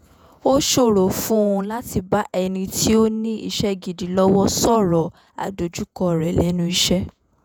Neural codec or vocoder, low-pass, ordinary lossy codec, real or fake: vocoder, 48 kHz, 128 mel bands, Vocos; none; none; fake